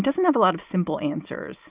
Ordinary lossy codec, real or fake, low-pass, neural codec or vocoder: Opus, 32 kbps; real; 3.6 kHz; none